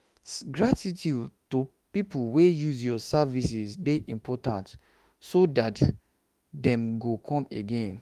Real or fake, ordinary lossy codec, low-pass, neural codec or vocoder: fake; Opus, 32 kbps; 14.4 kHz; autoencoder, 48 kHz, 32 numbers a frame, DAC-VAE, trained on Japanese speech